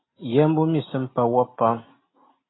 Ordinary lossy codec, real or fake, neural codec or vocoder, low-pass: AAC, 16 kbps; real; none; 7.2 kHz